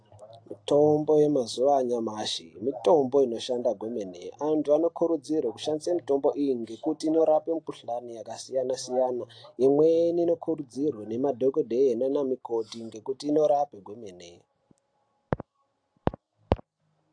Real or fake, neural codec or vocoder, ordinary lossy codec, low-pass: real; none; AAC, 48 kbps; 9.9 kHz